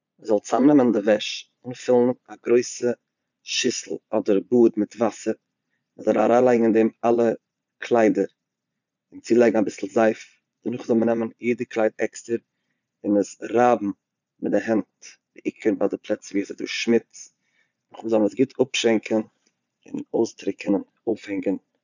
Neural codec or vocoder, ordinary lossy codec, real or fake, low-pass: vocoder, 22.05 kHz, 80 mel bands, Vocos; none; fake; 7.2 kHz